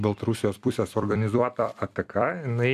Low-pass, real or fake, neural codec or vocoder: 14.4 kHz; fake; vocoder, 44.1 kHz, 128 mel bands every 256 samples, BigVGAN v2